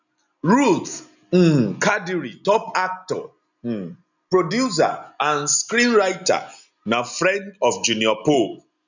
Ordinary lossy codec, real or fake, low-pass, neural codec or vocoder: none; real; 7.2 kHz; none